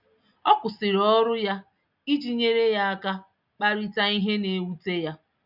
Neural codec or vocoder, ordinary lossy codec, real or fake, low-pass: none; none; real; 5.4 kHz